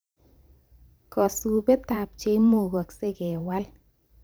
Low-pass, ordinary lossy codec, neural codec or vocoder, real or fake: none; none; vocoder, 44.1 kHz, 128 mel bands every 512 samples, BigVGAN v2; fake